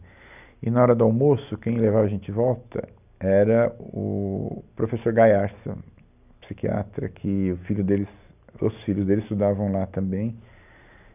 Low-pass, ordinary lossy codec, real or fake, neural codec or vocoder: 3.6 kHz; none; real; none